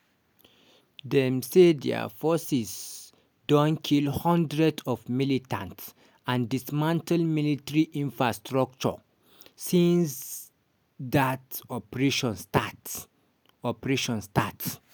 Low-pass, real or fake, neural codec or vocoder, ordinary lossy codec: none; real; none; none